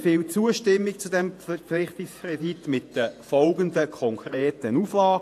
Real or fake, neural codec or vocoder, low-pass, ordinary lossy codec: fake; vocoder, 48 kHz, 128 mel bands, Vocos; 14.4 kHz; AAC, 64 kbps